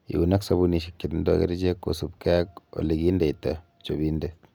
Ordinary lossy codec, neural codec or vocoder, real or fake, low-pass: none; none; real; none